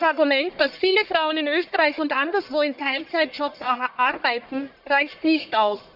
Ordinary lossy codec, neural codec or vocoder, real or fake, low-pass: none; codec, 44.1 kHz, 1.7 kbps, Pupu-Codec; fake; 5.4 kHz